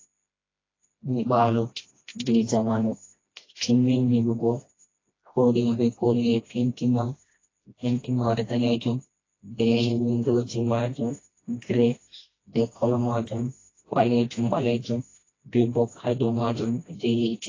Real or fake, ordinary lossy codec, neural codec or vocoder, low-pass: fake; AAC, 32 kbps; codec, 16 kHz, 1 kbps, FreqCodec, smaller model; 7.2 kHz